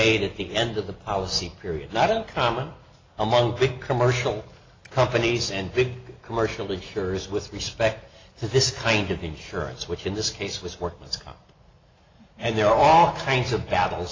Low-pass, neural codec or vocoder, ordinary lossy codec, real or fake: 7.2 kHz; none; AAC, 32 kbps; real